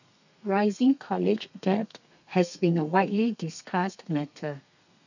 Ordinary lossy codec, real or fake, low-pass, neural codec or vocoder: none; fake; 7.2 kHz; codec, 32 kHz, 1.9 kbps, SNAC